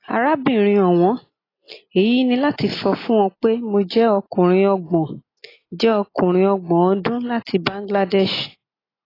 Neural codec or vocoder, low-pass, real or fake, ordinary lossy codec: none; 5.4 kHz; real; AAC, 24 kbps